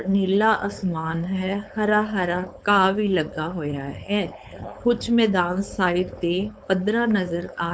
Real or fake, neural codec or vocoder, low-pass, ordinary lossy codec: fake; codec, 16 kHz, 4.8 kbps, FACodec; none; none